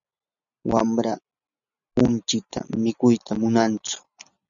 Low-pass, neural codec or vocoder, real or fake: 7.2 kHz; none; real